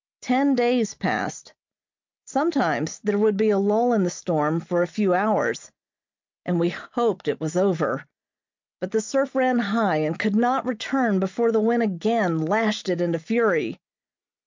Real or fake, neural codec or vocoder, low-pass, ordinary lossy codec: real; none; 7.2 kHz; MP3, 64 kbps